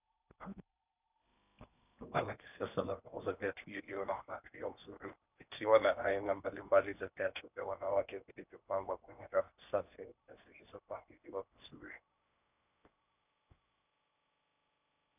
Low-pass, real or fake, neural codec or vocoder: 3.6 kHz; fake; codec, 16 kHz in and 24 kHz out, 0.8 kbps, FocalCodec, streaming, 65536 codes